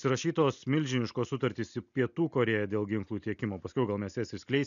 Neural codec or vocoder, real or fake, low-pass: none; real; 7.2 kHz